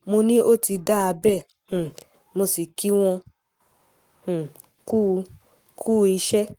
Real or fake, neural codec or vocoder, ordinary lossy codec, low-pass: fake; codec, 44.1 kHz, 7.8 kbps, Pupu-Codec; Opus, 24 kbps; 19.8 kHz